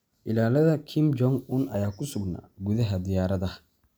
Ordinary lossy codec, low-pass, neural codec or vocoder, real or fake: none; none; none; real